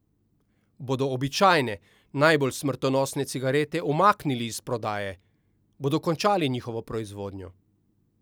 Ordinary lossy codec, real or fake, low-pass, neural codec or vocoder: none; real; none; none